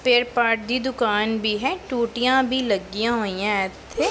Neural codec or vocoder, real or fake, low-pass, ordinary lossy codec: none; real; none; none